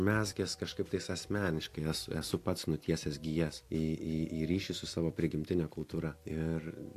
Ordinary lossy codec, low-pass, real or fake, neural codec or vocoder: AAC, 64 kbps; 14.4 kHz; real; none